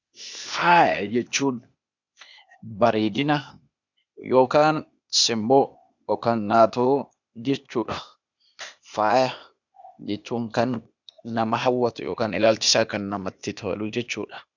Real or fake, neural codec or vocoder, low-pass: fake; codec, 16 kHz, 0.8 kbps, ZipCodec; 7.2 kHz